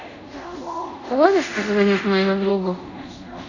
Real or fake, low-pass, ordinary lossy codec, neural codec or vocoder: fake; 7.2 kHz; none; codec, 24 kHz, 0.5 kbps, DualCodec